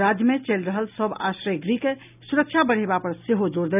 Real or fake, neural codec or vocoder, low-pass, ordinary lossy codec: real; none; 3.6 kHz; none